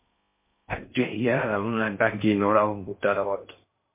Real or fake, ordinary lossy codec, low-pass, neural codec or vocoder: fake; MP3, 24 kbps; 3.6 kHz; codec, 16 kHz in and 24 kHz out, 0.6 kbps, FocalCodec, streaming, 4096 codes